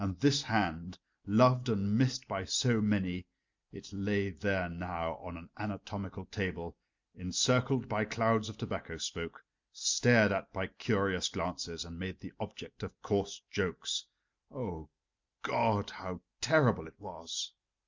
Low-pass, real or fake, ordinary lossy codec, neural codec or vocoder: 7.2 kHz; real; MP3, 64 kbps; none